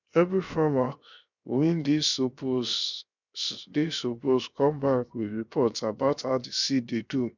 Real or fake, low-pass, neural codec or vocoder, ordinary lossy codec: fake; 7.2 kHz; codec, 16 kHz, 0.7 kbps, FocalCodec; none